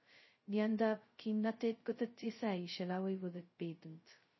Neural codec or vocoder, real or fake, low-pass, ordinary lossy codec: codec, 16 kHz, 0.2 kbps, FocalCodec; fake; 7.2 kHz; MP3, 24 kbps